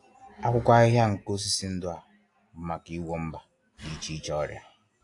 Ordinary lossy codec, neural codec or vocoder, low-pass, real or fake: AAC, 48 kbps; none; 10.8 kHz; real